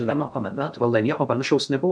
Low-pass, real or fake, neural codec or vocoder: 9.9 kHz; fake; codec, 16 kHz in and 24 kHz out, 0.6 kbps, FocalCodec, streaming, 2048 codes